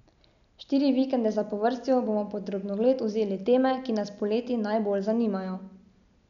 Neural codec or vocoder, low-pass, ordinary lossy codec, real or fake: none; 7.2 kHz; none; real